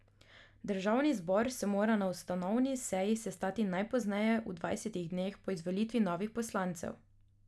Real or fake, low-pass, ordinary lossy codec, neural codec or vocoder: real; none; none; none